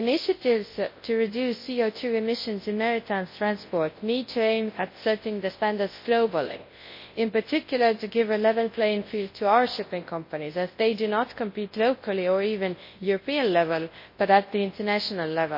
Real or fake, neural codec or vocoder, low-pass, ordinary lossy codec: fake; codec, 24 kHz, 0.9 kbps, WavTokenizer, large speech release; 5.4 kHz; MP3, 24 kbps